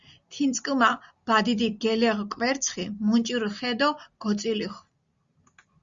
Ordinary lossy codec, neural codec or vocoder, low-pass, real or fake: Opus, 64 kbps; none; 7.2 kHz; real